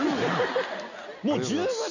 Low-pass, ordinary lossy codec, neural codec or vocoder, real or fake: 7.2 kHz; none; none; real